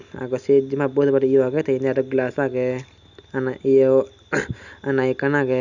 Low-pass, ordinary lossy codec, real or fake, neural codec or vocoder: 7.2 kHz; none; real; none